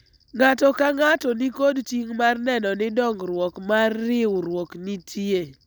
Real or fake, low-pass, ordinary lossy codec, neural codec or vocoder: real; none; none; none